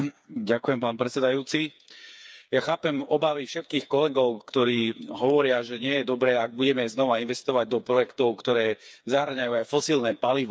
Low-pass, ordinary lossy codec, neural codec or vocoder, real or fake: none; none; codec, 16 kHz, 4 kbps, FreqCodec, smaller model; fake